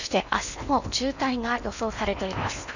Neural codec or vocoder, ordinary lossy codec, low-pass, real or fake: codec, 16 kHz in and 24 kHz out, 0.8 kbps, FocalCodec, streaming, 65536 codes; none; 7.2 kHz; fake